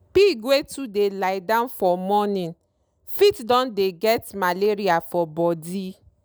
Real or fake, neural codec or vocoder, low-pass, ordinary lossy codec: real; none; none; none